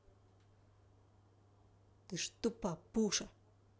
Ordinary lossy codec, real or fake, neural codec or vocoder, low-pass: none; real; none; none